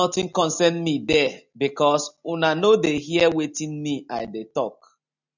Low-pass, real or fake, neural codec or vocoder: 7.2 kHz; real; none